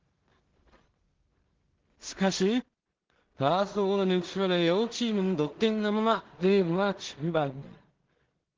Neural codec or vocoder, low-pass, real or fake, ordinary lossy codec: codec, 16 kHz in and 24 kHz out, 0.4 kbps, LongCat-Audio-Codec, two codebook decoder; 7.2 kHz; fake; Opus, 16 kbps